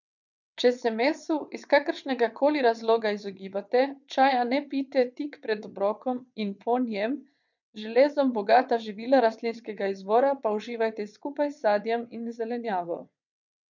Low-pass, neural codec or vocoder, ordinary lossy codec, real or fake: 7.2 kHz; vocoder, 22.05 kHz, 80 mel bands, WaveNeXt; none; fake